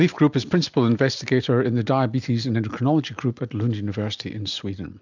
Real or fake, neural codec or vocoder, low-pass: real; none; 7.2 kHz